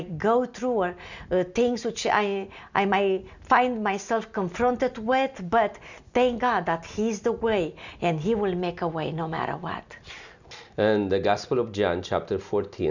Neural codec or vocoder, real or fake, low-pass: none; real; 7.2 kHz